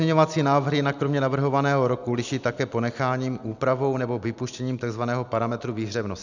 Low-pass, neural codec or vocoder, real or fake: 7.2 kHz; none; real